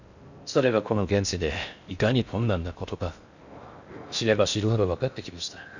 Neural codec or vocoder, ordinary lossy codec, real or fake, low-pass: codec, 16 kHz in and 24 kHz out, 0.6 kbps, FocalCodec, streaming, 4096 codes; none; fake; 7.2 kHz